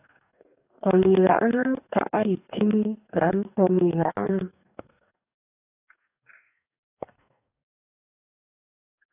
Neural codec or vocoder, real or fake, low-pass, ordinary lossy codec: codec, 44.1 kHz, 2.6 kbps, DAC; fake; 3.6 kHz; AAC, 32 kbps